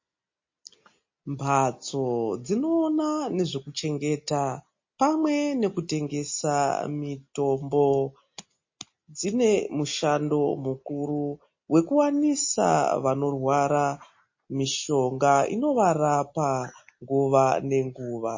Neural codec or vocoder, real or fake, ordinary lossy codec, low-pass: none; real; MP3, 32 kbps; 7.2 kHz